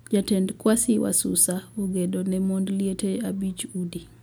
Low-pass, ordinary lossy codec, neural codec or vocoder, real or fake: 19.8 kHz; none; none; real